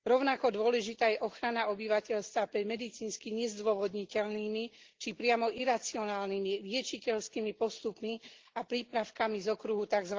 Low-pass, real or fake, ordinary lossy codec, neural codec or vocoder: 7.2 kHz; real; Opus, 16 kbps; none